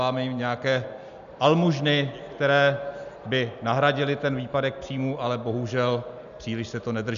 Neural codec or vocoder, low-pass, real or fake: none; 7.2 kHz; real